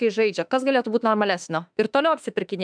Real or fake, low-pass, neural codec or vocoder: fake; 9.9 kHz; autoencoder, 48 kHz, 32 numbers a frame, DAC-VAE, trained on Japanese speech